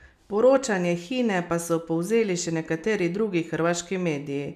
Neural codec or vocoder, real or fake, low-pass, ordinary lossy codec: none; real; 14.4 kHz; none